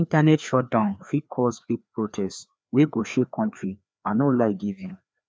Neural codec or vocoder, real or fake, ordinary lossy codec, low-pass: codec, 16 kHz, 2 kbps, FreqCodec, larger model; fake; none; none